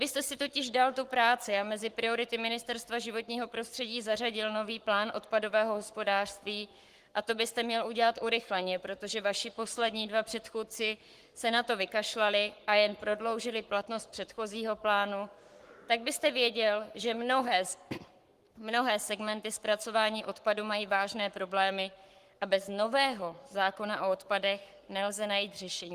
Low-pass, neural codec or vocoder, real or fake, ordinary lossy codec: 14.4 kHz; codec, 44.1 kHz, 7.8 kbps, Pupu-Codec; fake; Opus, 24 kbps